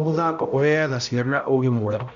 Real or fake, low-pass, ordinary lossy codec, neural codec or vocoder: fake; 7.2 kHz; none; codec, 16 kHz, 0.5 kbps, X-Codec, HuBERT features, trained on balanced general audio